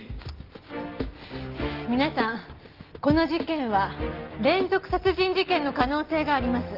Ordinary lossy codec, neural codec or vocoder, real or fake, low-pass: Opus, 32 kbps; none; real; 5.4 kHz